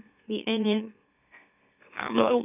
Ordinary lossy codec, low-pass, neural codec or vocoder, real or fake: none; 3.6 kHz; autoencoder, 44.1 kHz, a latent of 192 numbers a frame, MeloTTS; fake